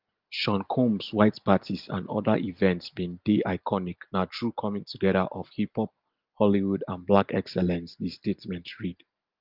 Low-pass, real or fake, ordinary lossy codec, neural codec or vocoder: 5.4 kHz; real; Opus, 32 kbps; none